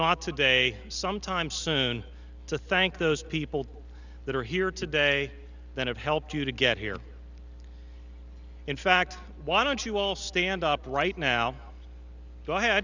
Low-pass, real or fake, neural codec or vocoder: 7.2 kHz; real; none